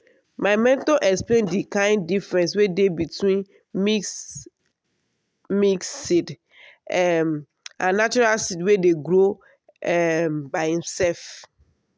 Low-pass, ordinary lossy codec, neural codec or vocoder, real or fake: none; none; none; real